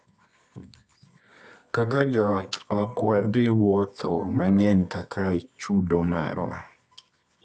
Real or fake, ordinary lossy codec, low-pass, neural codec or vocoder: fake; none; none; codec, 24 kHz, 0.9 kbps, WavTokenizer, medium music audio release